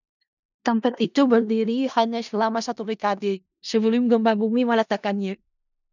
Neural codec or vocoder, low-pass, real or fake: codec, 16 kHz in and 24 kHz out, 0.4 kbps, LongCat-Audio-Codec, four codebook decoder; 7.2 kHz; fake